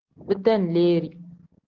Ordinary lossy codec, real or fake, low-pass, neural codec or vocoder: Opus, 16 kbps; real; 7.2 kHz; none